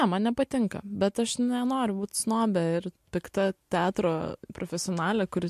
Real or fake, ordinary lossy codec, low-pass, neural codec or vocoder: real; MP3, 64 kbps; 14.4 kHz; none